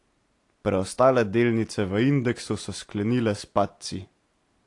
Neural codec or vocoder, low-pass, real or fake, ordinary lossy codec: none; 10.8 kHz; real; AAC, 48 kbps